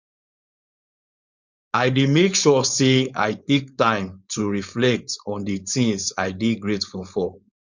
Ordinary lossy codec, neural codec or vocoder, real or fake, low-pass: Opus, 64 kbps; codec, 16 kHz, 4.8 kbps, FACodec; fake; 7.2 kHz